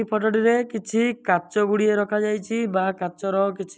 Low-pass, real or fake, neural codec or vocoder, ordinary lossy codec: none; real; none; none